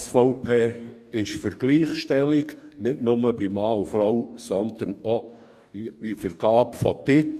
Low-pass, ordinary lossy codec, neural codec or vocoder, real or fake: 14.4 kHz; none; codec, 44.1 kHz, 2.6 kbps, DAC; fake